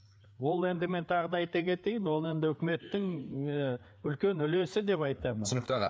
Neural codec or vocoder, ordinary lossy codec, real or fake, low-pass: codec, 16 kHz, 4 kbps, FreqCodec, larger model; none; fake; none